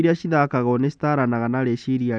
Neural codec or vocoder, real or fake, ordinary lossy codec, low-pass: none; real; none; 9.9 kHz